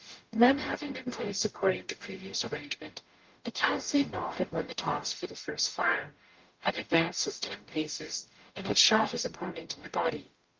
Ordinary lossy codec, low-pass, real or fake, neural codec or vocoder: Opus, 32 kbps; 7.2 kHz; fake; codec, 44.1 kHz, 0.9 kbps, DAC